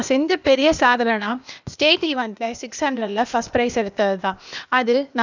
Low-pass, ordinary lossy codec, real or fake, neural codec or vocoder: 7.2 kHz; none; fake; codec, 16 kHz, 0.8 kbps, ZipCodec